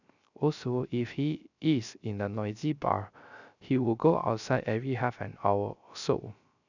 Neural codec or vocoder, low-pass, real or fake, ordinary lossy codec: codec, 16 kHz, 0.3 kbps, FocalCodec; 7.2 kHz; fake; none